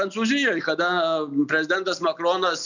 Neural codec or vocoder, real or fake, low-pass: none; real; 7.2 kHz